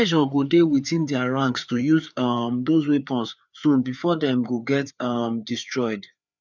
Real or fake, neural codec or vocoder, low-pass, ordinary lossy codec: fake; codec, 16 kHz, 4 kbps, FreqCodec, larger model; 7.2 kHz; none